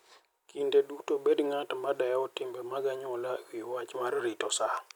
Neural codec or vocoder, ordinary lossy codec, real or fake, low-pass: none; none; real; none